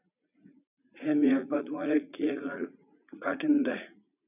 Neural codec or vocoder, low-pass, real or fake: vocoder, 44.1 kHz, 80 mel bands, Vocos; 3.6 kHz; fake